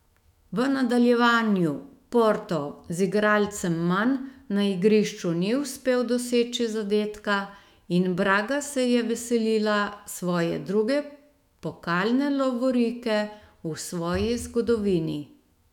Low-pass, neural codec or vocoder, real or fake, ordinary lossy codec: 19.8 kHz; autoencoder, 48 kHz, 128 numbers a frame, DAC-VAE, trained on Japanese speech; fake; none